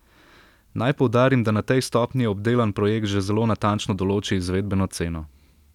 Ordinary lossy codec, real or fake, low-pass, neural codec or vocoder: none; real; 19.8 kHz; none